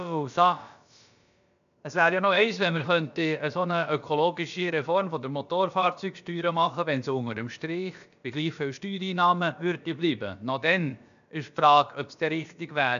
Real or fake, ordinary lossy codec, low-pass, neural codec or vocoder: fake; none; 7.2 kHz; codec, 16 kHz, about 1 kbps, DyCAST, with the encoder's durations